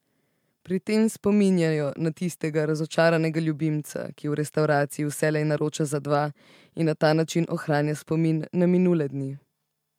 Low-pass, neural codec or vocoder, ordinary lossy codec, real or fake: 19.8 kHz; none; MP3, 96 kbps; real